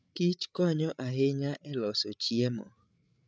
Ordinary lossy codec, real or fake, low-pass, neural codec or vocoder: none; fake; none; codec, 16 kHz, 8 kbps, FreqCodec, larger model